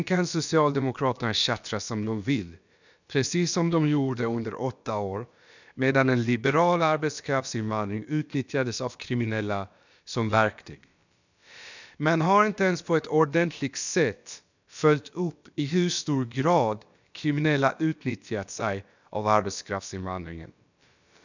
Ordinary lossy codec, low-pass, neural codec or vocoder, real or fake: none; 7.2 kHz; codec, 16 kHz, about 1 kbps, DyCAST, with the encoder's durations; fake